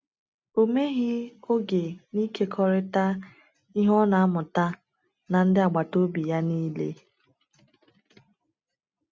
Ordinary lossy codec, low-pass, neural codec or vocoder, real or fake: none; none; none; real